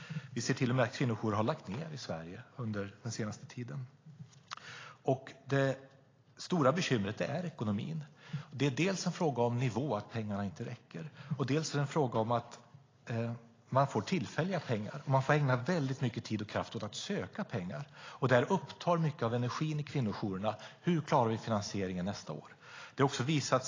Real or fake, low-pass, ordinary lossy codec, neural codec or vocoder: real; 7.2 kHz; AAC, 32 kbps; none